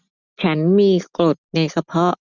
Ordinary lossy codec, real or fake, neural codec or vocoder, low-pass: none; real; none; 7.2 kHz